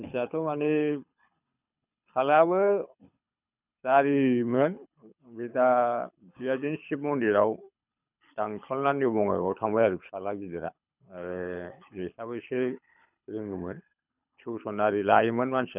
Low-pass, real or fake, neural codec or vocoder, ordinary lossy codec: 3.6 kHz; fake; codec, 24 kHz, 6 kbps, HILCodec; none